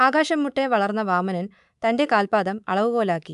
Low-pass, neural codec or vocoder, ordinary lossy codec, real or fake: 10.8 kHz; codec, 24 kHz, 3.1 kbps, DualCodec; AAC, 96 kbps; fake